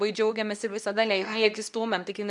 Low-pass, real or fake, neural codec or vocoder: 10.8 kHz; fake; codec, 24 kHz, 0.9 kbps, WavTokenizer, medium speech release version 2